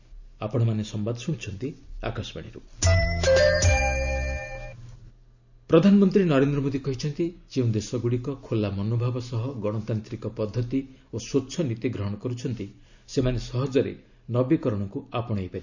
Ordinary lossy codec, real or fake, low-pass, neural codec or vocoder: none; real; 7.2 kHz; none